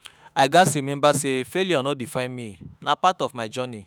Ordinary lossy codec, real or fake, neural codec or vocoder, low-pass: none; fake; autoencoder, 48 kHz, 32 numbers a frame, DAC-VAE, trained on Japanese speech; none